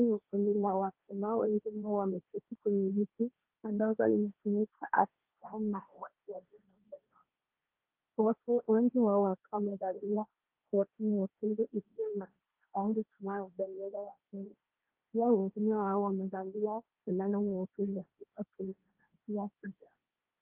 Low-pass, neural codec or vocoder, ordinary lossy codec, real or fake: 3.6 kHz; codec, 16 kHz, 1.1 kbps, Voila-Tokenizer; Opus, 32 kbps; fake